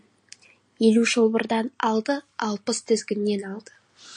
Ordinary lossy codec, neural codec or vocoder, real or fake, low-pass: MP3, 48 kbps; none; real; 9.9 kHz